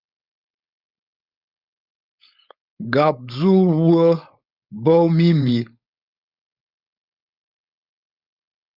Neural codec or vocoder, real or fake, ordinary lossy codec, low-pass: codec, 16 kHz, 4.8 kbps, FACodec; fake; Opus, 64 kbps; 5.4 kHz